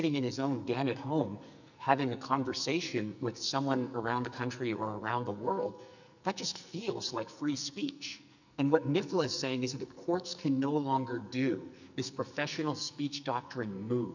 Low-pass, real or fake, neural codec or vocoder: 7.2 kHz; fake; codec, 44.1 kHz, 2.6 kbps, SNAC